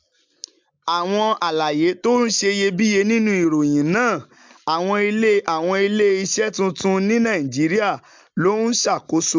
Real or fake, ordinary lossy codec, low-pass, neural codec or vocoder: real; MP3, 64 kbps; 7.2 kHz; none